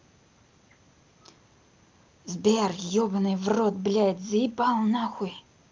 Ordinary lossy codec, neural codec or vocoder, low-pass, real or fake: Opus, 24 kbps; none; 7.2 kHz; real